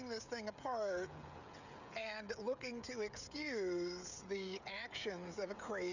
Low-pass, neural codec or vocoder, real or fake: 7.2 kHz; codec, 16 kHz, 16 kbps, FreqCodec, smaller model; fake